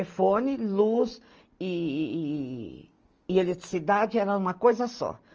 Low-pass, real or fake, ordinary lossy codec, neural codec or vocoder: 7.2 kHz; real; Opus, 24 kbps; none